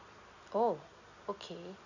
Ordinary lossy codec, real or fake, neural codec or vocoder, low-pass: none; real; none; 7.2 kHz